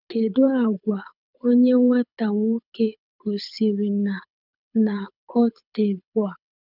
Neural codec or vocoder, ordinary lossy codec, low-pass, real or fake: codec, 24 kHz, 6 kbps, HILCodec; none; 5.4 kHz; fake